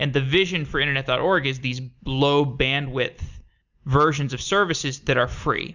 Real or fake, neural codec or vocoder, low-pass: real; none; 7.2 kHz